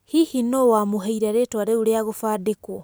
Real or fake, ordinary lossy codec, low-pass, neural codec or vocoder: real; none; none; none